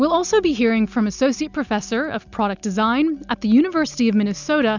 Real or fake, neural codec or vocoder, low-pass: real; none; 7.2 kHz